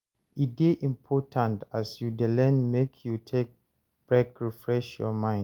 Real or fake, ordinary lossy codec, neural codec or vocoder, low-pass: real; Opus, 32 kbps; none; 19.8 kHz